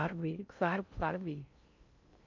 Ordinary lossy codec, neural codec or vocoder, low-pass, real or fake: MP3, 64 kbps; codec, 16 kHz in and 24 kHz out, 0.6 kbps, FocalCodec, streaming, 4096 codes; 7.2 kHz; fake